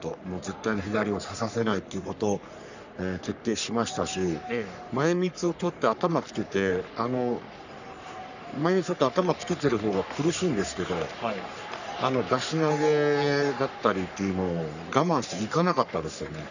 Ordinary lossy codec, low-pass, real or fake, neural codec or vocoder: none; 7.2 kHz; fake; codec, 44.1 kHz, 3.4 kbps, Pupu-Codec